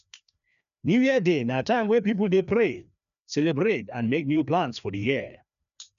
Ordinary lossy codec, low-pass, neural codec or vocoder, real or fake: none; 7.2 kHz; codec, 16 kHz, 2 kbps, FreqCodec, larger model; fake